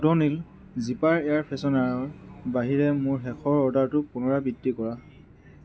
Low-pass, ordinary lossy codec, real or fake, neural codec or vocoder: none; none; real; none